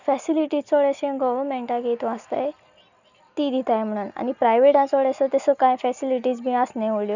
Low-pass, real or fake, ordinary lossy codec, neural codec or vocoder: 7.2 kHz; real; none; none